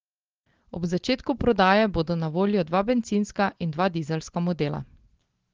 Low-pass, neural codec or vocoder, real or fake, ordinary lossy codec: 7.2 kHz; none; real; Opus, 16 kbps